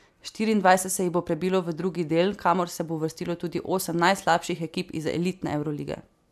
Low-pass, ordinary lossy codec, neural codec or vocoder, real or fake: 14.4 kHz; AAC, 96 kbps; none; real